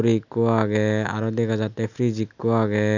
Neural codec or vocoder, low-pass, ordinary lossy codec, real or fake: none; 7.2 kHz; none; real